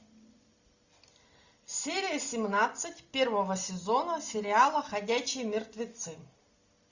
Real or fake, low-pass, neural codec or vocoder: real; 7.2 kHz; none